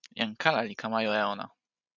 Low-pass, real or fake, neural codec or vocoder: 7.2 kHz; real; none